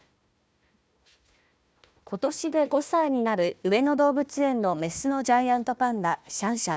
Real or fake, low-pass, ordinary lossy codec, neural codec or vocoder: fake; none; none; codec, 16 kHz, 1 kbps, FunCodec, trained on Chinese and English, 50 frames a second